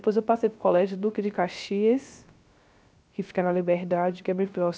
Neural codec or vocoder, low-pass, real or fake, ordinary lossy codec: codec, 16 kHz, 0.3 kbps, FocalCodec; none; fake; none